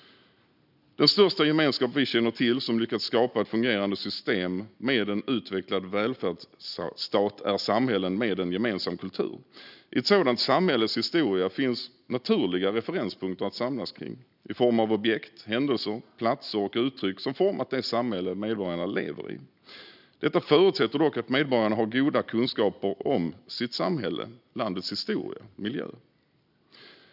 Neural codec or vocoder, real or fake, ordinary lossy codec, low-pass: none; real; none; 5.4 kHz